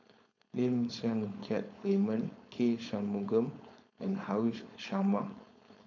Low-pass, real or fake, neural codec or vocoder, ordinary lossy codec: 7.2 kHz; fake; codec, 16 kHz, 4.8 kbps, FACodec; none